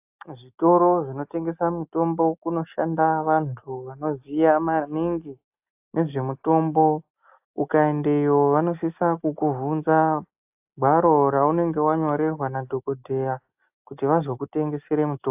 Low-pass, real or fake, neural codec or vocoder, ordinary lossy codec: 3.6 kHz; real; none; AAC, 32 kbps